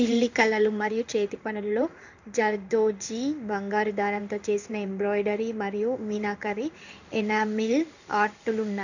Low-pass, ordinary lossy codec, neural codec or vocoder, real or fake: 7.2 kHz; none; codec, 16 kHz in and 24 kHz out, 1 kbps, XY-Tokenizer; fake